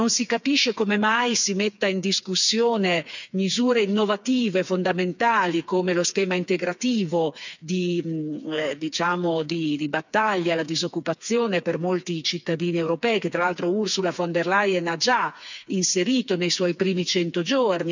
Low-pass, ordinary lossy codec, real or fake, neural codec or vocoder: 7.2 kHz; none; fake; codec, 16 kHz, 4 kbps, FreqCodec, smaller model